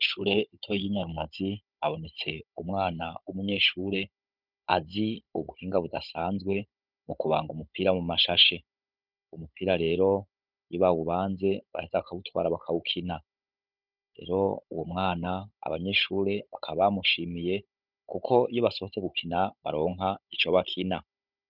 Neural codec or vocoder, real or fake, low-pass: codec, 16 kHz, 16 kbps, FunCodec, trained on Chinese and English, 50 frames a second; fake; 5.4 kHz